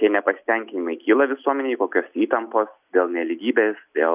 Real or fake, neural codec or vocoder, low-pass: real; none; 3.6 kHz